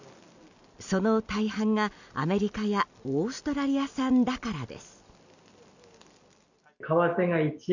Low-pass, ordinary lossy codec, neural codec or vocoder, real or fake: 7.2 kHz; none; none; real